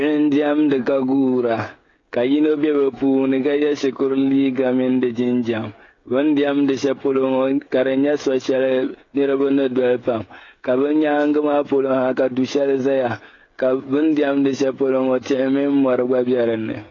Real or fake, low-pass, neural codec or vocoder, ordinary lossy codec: fake; 7.2 kHz; codec, 16 kHz, 16 kbps, FreqCodec, smaller model; AAC, 32 kbps